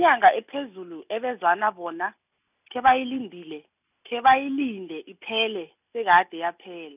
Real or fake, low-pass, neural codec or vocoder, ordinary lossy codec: real; 3.6 kHz; none; none